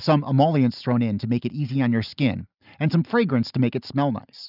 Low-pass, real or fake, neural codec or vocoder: 5.4 kHz; real; none